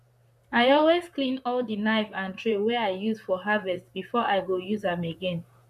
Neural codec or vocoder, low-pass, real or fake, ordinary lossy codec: vocoder, 44.1 kHz, 128 mel bands, Pupu-Vocoder; 14.4 kHz; fake; none